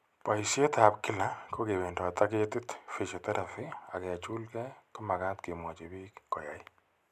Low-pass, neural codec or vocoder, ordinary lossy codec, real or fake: 14.4 kHz; none; none; real